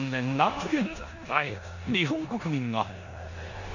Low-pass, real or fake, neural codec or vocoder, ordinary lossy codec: 7.2 kHz; fake; codec, 16 kHz in and 24 kHz out, 0.9 kbps, LongCat-Audio-Codec, four codebook decoder; none